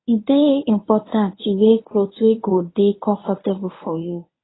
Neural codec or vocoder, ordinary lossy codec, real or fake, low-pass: codec, 24 kHz, 0.9 kbps, WavTokenizer, medium speech release version 1; AAC, 16 kbps; fake; 7.2 kHz